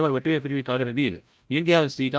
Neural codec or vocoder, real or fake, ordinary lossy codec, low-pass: codec, 16 kHz, 0.5 kbps, FreqCodec, larger model; fake; none; none